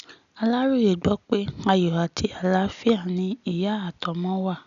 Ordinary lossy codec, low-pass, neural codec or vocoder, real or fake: none; 7.2 kHz; none; real